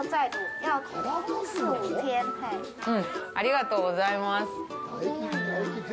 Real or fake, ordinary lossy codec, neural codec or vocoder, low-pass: real; none; none; none